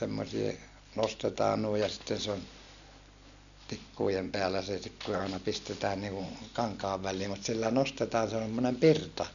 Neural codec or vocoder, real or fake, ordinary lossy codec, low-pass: none; real; none; 7.2 kHz